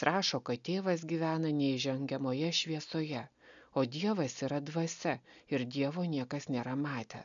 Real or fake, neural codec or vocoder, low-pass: real; none; 7.2 kHz